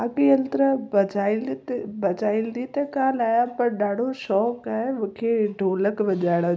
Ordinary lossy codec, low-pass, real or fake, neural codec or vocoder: none; none; real; none